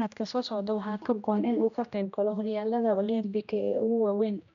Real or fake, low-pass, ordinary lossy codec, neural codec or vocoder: fake; 7.2 kHz; none; codec, 16 kHz, 1 kbps, X-Codec, HuBERT features, trained on general audio